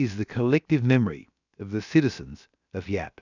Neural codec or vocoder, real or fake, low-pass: codec, 16 kHz, about 1 kbps, DyCAST, with the encoder's durations; fake; 7.2 kHz